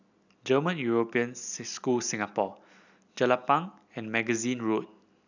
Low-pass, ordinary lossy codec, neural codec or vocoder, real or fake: 7.2 kHz; none; none; real